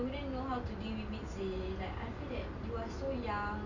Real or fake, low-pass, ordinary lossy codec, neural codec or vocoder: real; 7.2 kHz; none; none